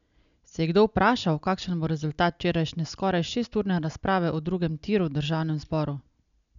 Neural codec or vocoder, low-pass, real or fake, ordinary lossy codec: none; 7.2 kHz; real; none